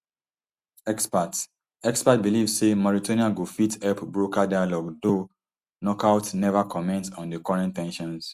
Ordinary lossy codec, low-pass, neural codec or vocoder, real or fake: Opus, 64 kbps; 14.4 kHz; none; real